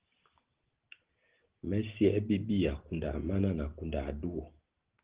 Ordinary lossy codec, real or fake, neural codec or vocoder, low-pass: Opus, 16 kbps; real; none; 3.6 kHz